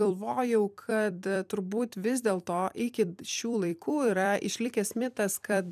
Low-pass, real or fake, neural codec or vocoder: 14.4 kHz; fake; vocoder, 44.1 kHz, 128 mel bands every 256 samples, BigVGAN v2